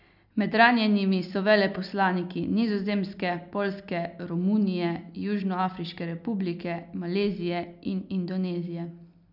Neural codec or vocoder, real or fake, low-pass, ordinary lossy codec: none; real; 5.4 kHz; none